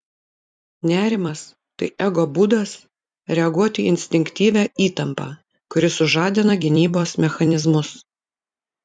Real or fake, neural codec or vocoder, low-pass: fake; vocoder, 44.1 kHz, 128 mel bands every 256 samples, BigVGAN v2; 9.9 kHz